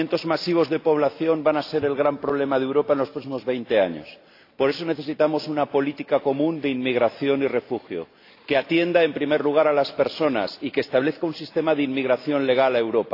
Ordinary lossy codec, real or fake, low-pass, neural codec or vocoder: AAC, 32 kbps; real; 5.4 kHz; none